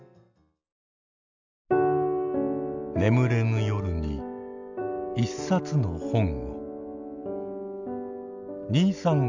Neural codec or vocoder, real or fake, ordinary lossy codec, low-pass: none; real; none; 7.2 kHz